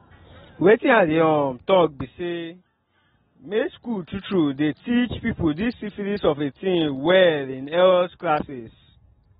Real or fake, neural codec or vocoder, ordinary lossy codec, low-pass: real; none; AAC, 16 kbps; 19.8 kHz